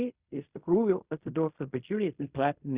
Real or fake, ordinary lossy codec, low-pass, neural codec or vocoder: fake; Opus, 64 kbps; 3.6 kHz; codec, 16 kHz in and 24 kHz out, 0.4 kbps, LongCat-Audio-Codec, fine tuned four codebook decoder